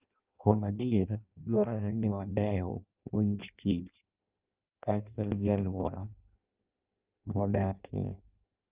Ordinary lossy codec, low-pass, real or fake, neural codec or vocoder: Opus, 24 kbps; 3.6 kHz; fake; codec, 16 kHz in and 24 kHz out, 0.6 kbps, FireRedTTS-2 codec